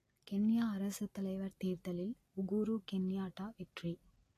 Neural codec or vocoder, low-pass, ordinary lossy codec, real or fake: none; 14.4 kHz; AAC, 48 kbps; real